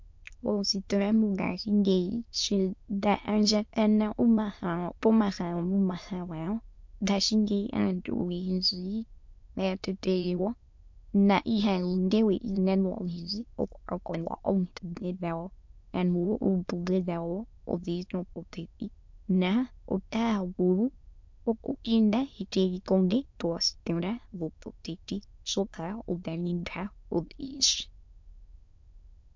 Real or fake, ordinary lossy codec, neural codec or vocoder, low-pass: fake; MP3, 48 kbps; autoencoder, 22.05 kHz, a latent of 192 numbers a frame, VITS, trained on many speakers; 7.2 kHz